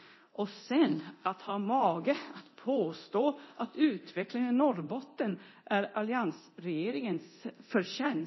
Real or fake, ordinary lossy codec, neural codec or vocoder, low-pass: fake; MP3, 24 kbps; codec, 24 kHz, 0.9 kbps, DualCodec; 7.2 kHz